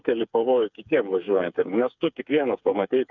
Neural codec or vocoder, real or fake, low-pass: codec, 16 kHz, 4 kbps, FreqCodec, smaller model; fake; 7.2 kHz